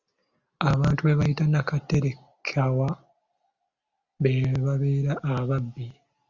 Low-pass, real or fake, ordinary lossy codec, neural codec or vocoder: 7.2 kHz; real; Opus, 64 kbps; none